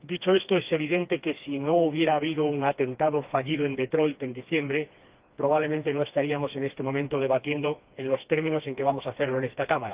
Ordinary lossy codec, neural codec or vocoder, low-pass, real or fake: Opus, 24 kbps; codec, 16 kHz, 2 kbps, FreqCodec, smaller model; 3.6 kHz; fake